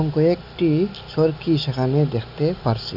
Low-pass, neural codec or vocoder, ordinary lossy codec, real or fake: 5.4 kHz; none; none; real